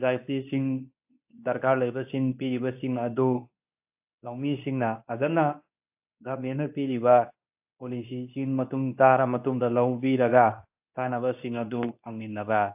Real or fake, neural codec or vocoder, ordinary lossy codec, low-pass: fake; codec, 24 kHz, 0.9 kbps, WavTokenizer, medium speech release version 2; AAC, 32 kbps; 3.6 kHz